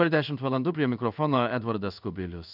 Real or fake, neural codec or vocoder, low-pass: fake; codec, 16 kHz in and 24 kHz out, 1 kbps, XY-Tokenizer; 5.4 kHz